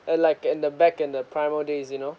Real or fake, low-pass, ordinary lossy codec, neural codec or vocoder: real; none; none; none